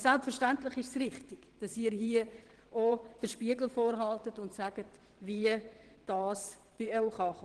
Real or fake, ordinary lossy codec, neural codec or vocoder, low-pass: fake; Opus, 16 kbps; vocoder, 22.05 kHz, 80 mel bands, WaveNeXt; 9.9 kHz